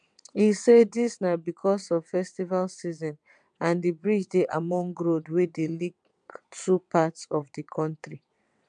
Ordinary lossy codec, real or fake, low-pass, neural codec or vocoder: MP3, 96 kbps; fake; 9.9 kHz; vocoder, 22.05 kHz, 80 mel bands, WaveNeXt